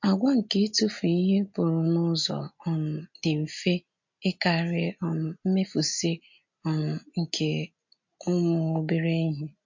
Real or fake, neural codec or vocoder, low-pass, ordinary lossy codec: real; none; 7.2 kHz; MP3, 48 kbps